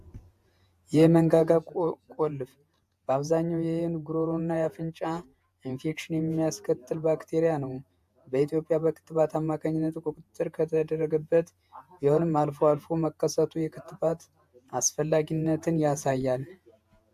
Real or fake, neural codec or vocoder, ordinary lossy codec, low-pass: fake; vocoder, 48 kHz, 128 mel bands, Vocos; AAC, 96 kbps; 14.4 kHz